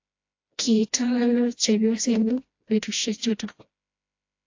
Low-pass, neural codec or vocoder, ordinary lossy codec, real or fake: 7.2 kHz; codec, 16 kHz, 1 kbps, FreqCodec, smaller model; AAC, 48 kbps; fake